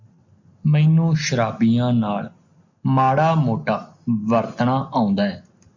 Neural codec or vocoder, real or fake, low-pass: none; real; 7.2 kHz